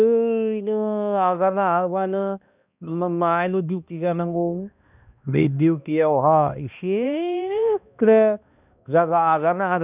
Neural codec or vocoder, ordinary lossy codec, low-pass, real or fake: codec, 16 kHz, 1 kbps, X-Codec, HuBERT features, trained on balanced general audio; none; 3.6 kHz; fake